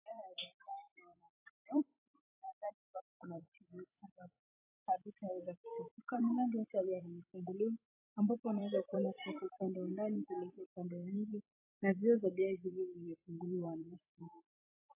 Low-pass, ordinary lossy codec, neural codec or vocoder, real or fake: 3.6 kHz; AAC, 24 kbps; vocoder, 44.1 kHz, 128 mel bands every 256 samples, BigVGAN v2; fake